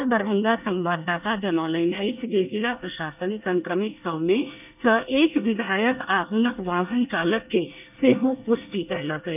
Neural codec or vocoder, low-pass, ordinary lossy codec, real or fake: codec, 24 kHz, 1 kbps, SNAC; 3.6 kHz; none; fake